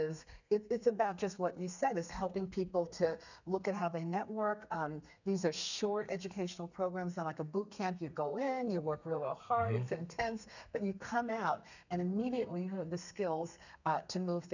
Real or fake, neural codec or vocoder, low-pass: fake; codec, 32 kHz, 1.9 kbps, SNAC; 7.2 kHz